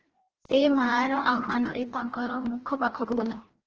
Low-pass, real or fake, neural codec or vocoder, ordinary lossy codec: 7.2 kHz; fake; codec, 16 kHz, 1 kbps, FreqCodec, larger model; Opus, 16 kbps